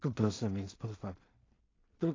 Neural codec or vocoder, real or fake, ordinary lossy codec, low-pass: codec, 16 kHz in and 24 kHz out, 0.4 kbps, LongCat-Audio-Codec, two codebook decoder; fake; AAC, 32 kbps; 7.2 kHz